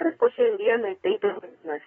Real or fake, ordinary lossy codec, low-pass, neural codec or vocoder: fake; AAC, 24 kbps; 10.8 kHz; codec, 24 kHz, 1 kbps, SNAC